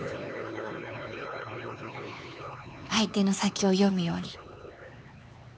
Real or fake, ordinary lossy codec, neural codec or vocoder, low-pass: fake; none; codec, 16 kHz, 4 kbps, X-Codec, HuBERT features, trained on LibriSpeech; none